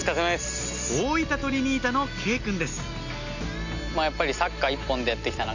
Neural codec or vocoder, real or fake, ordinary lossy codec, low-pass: none; real; none; 7.2 kHz